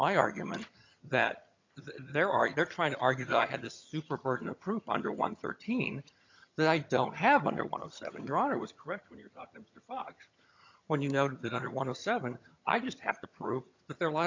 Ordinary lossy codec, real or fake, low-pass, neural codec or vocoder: MP3, 64 kbps; fake; 7.2 kHz; vocoder, 22.05 kHz, 80 mel bands, HiFi-GAN